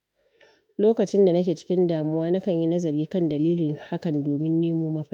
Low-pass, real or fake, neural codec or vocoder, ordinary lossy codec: 19.8 kHz; fake; autoencoder, 48 kHz, 32 numbers a frame, DAC-VAE, trained on Japanese speech; none